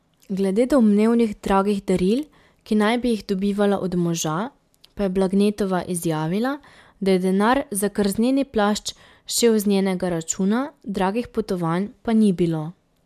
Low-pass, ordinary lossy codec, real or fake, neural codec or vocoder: 14.4 kHz; MP3, 96 kbps; real; none